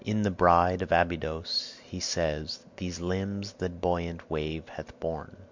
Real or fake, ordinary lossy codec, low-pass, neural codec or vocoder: real; MP3, 64 kbps; 7.2 kHz; none